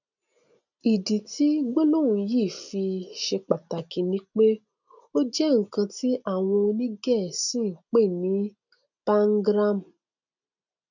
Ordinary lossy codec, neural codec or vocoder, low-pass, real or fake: none; none; 7.2 kHz; real